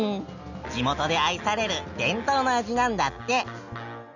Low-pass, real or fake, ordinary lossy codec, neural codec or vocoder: 7.2 kHz; real; none; none